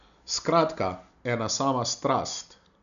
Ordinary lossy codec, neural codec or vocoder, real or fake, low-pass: none; none; real; 7.2 kHz